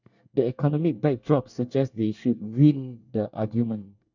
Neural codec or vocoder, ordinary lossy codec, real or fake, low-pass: codec, 24 kHz, 1 kbps, SNAC; none; fake; 7.2 kHz